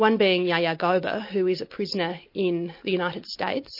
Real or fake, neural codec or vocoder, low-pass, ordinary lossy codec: real; none; 5.4 kHz; MP3, 32 kbps